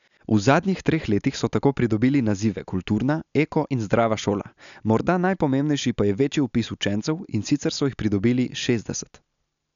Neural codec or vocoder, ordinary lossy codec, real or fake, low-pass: none; none; real; 7.2 kHz